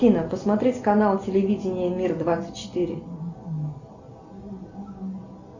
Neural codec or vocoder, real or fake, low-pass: none; real; 7.2 kHz